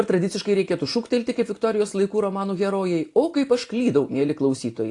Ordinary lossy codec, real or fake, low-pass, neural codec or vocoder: AAC, 48 kbps; real; 10.8 kHz; none